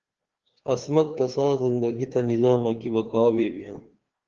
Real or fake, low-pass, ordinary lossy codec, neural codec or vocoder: fake; 7.2 kHz; Opus, 16 kbps; codec, 16 kHz, 2 kbps, FreqCodec, larger model